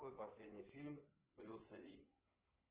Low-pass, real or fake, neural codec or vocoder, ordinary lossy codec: 3.6 kHz; fake; codec, 16 kHz in and 24 kHz out, 2.2 kbps, FireRedTTS-2 codec; Opus, 16 kbps